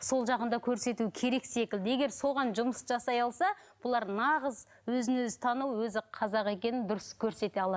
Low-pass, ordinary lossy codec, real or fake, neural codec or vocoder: none; none; real; none